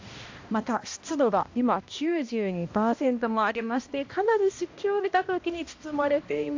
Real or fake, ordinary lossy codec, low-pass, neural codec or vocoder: fake; AAC, 48 kbps; 7.2 kHz; codec, 16 kHz, 1 kbps, X-Codec, HuBERT features, trained on balanced general audio